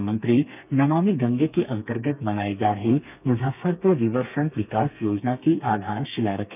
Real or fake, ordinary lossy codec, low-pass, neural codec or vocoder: fake; none; 3.6 kHz; codec, 32 kHz, 1.9 kbps, SNAC